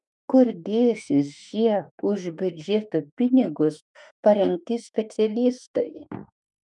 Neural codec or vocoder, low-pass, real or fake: autoencoder, 48 kHz, 32 numbers a frame, DAC-VAE, trained on Japanese speech; 10.8 kHz; fake